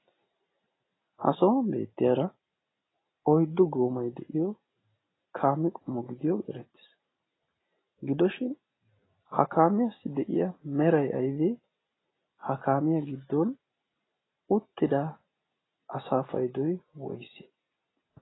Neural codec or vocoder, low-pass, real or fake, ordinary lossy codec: none; 7.2 kHz; real; AAC, 16 kbps